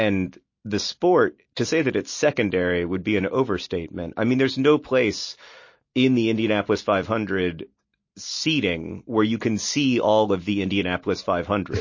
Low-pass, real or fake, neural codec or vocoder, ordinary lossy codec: 7.2 kHz; real; none; MP3, 32 kbps